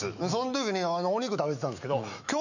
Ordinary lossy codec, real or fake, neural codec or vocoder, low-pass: none; fake; autoencoder, 48 kHz, 128 numbers a frame, DAC-VAE, trained on Japanese speech; 7.2 kHz